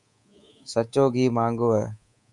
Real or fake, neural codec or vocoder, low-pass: fake; codec, 24 kHz, 3.1 kbps, DualCodec; 10.8 kHz